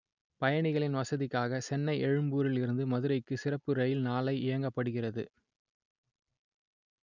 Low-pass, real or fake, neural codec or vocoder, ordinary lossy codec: 7.2 kHz; real; none; none